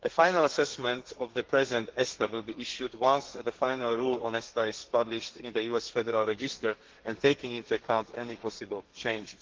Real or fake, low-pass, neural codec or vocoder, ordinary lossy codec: fake; 7.2 kHz; codec, 32 kHz, 1.9 kbps, SNAC; Opus, 16 kbps